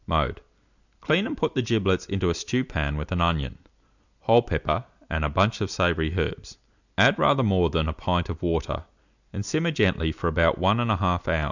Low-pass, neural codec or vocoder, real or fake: 7.2 kHz; none; real